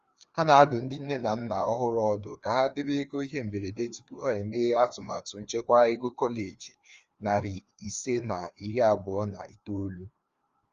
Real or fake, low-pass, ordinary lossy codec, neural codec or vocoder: fake; 7.2 kHz; Opus, 32 kbps; codec, 16 kHz, 2 kbps, FreqCodec, larger model